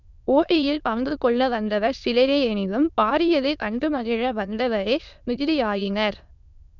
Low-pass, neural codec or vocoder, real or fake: 7.2 kHz; autoencoder, 22.05 kHz, a latent of 192 numbers a frame, VITS, trained on many speakers; fake